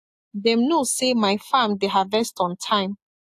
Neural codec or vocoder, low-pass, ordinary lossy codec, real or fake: none; 14.4 kHz; AAC, 64 kbps; real